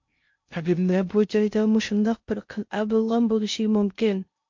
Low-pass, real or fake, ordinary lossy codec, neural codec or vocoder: 7.2 kHz; fake; MP3, 48 kbps; codec, 16 kHz in and 24 kHz out, 0.6 kbps, FocalCodec, streaming, 2048 codes